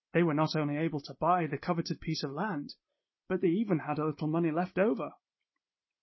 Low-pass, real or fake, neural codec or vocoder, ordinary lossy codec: 7.2 kHz; real; none; MP3, 24 kbps